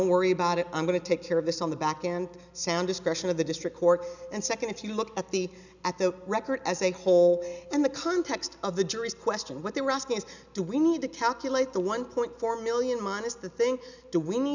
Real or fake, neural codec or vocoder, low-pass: real; none; 7.2 kHz